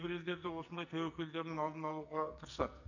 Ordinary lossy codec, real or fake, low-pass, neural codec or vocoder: none; fake; 7.2 kHz; codec, 44.1 kHz, 2.6 kbps, SNAC